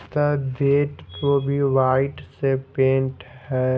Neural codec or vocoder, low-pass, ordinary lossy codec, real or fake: none; none; none; real